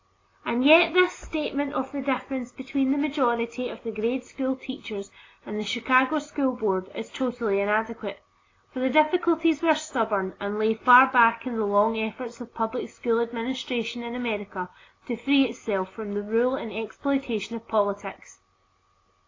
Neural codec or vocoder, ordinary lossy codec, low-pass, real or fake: none; AAC, 32 kbps; 7.2 kHz; real